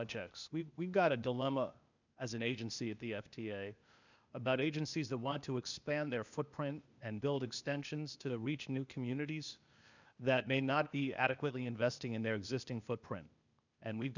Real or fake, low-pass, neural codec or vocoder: fake; 7.2 kHz; codec, 16 kHz, 0.8 kbps, ZipCodec